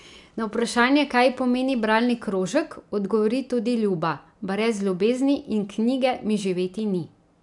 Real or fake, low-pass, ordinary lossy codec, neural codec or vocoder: real; 10.8 kHz; none; none